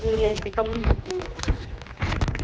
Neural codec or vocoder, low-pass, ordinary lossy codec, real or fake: codec, 16 kHz, 2 kbps, X-Codec, HuBERT features, trained on general audio; none; none; fake